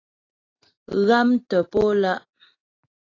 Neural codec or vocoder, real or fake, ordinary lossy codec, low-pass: none; real; AAC, 32 kbps; 7.2 kHz